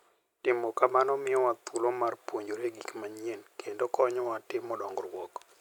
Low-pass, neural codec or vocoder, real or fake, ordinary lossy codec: 19.8 kHz; none; real; none